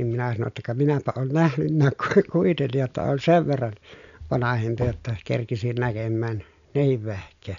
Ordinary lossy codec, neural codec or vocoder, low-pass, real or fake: none; none; 7.2 kHz; real